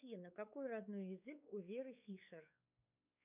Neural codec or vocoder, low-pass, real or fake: codec, 16 kHz, 4 kbps, X-Codec, WavLM features, trained on Multilingual LibriSpeech; 3.6 kHz; fake